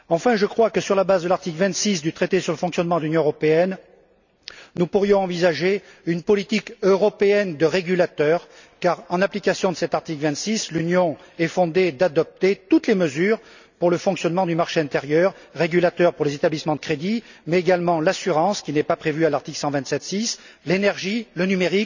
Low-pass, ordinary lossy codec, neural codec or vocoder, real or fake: 7.2 kHz; none; none; real